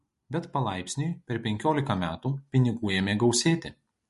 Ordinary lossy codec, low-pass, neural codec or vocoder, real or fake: MP3, 48 kbps; 14.4 kHz; none; real